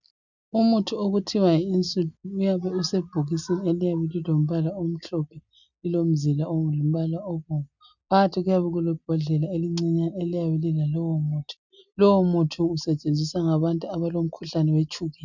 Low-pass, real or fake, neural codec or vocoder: 7.2 kHz; real; none